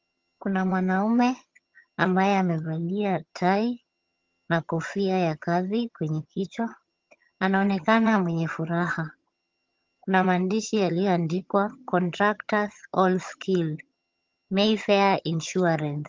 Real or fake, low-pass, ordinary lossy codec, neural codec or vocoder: fake; 7.2 kHz; Opus, 32 kbps; vocoder, 22.05 kHz, 80 mel bands, HiFi-GAN